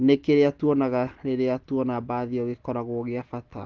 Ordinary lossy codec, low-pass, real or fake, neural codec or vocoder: Opus, 16 kbps; 7.2 kHz; real; none